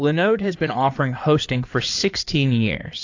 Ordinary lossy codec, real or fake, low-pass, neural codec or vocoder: AAC, 48 kbps; fake; 7.2 kHz; codec, 16 kHz in and 24 kHz out, 2.2 kbps, FireRedTTS-2 codec